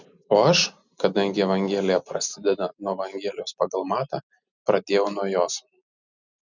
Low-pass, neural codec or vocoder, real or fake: 7.2 kHz; none; real